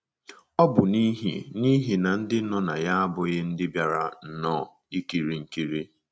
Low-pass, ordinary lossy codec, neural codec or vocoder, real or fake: none; none; none; real